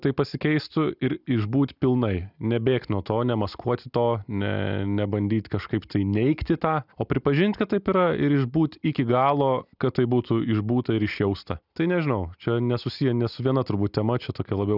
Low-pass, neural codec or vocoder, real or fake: 5.4 kHz; none; real